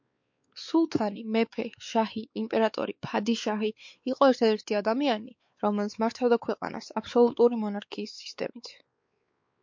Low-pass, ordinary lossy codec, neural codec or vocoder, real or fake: 7.2 kHz; MP3, 48 kbps; codec, 16 kHz, 4 kbps, X-Codec, WavLM features, trained on Multilingual LibriSpeech; fake